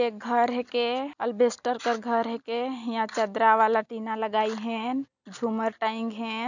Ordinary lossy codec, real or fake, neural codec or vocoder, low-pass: none; real; none; 7.2 kHz